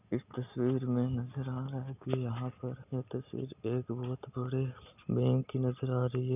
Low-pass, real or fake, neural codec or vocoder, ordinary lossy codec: 3.6 kHz; real; none; none